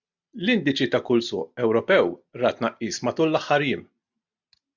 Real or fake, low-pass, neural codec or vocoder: real; 7.2 kHz; none